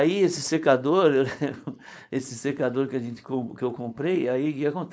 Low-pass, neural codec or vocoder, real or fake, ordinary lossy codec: none; codec, 16 kHz, 4.8 kbps, FACodec; fake; none